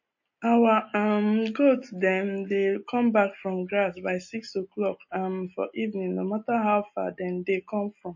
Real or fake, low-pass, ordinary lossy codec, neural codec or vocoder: real; 7.2 kHz; MP3, 32 kbps; none